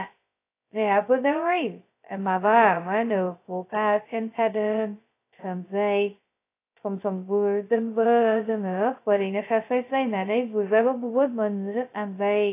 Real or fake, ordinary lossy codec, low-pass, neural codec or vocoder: fake; AAC, 24 kbps; 3.6 kHz; codec, 16 kHz, 0.2 kbps, FocalCodec